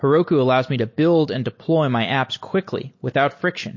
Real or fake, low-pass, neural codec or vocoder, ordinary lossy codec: real; 7.2 kHz; none; MP3, 32 kbps